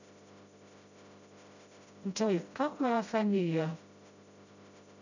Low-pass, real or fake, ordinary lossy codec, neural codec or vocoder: 7.2 kHz; fake; none; codec, 16 kHz, 0.5 kbps, FreqCodec, smaller model